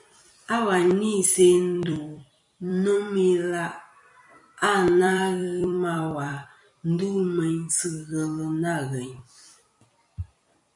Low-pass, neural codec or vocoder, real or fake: 10.8 kHz; vocoder, 44.1 kHz, 128 mel bands every 256 samples, BigVGAN v2; fake